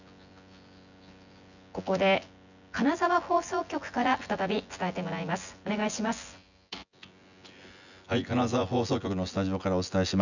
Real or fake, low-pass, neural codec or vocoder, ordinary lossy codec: fake; 7.2 kHz; vocoder, 24 kHz, 100 mel bands, Vocos; none